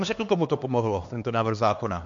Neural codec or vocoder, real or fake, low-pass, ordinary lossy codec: codec, 16 kHz, 2 kbps, X-Codec, HuBERT features, trained on LibriSpeech; fake; 7.2 kHz; MP3, 48 kbps